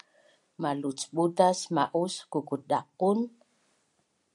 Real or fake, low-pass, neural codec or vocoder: fake; 10.8 kHz; vocoder, 44.1 kHz, 128 mel bands every 256 samples, BigVGAN v2